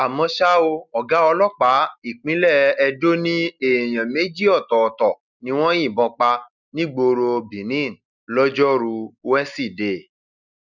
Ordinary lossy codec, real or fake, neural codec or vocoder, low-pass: none; real; none; 7.2 kHz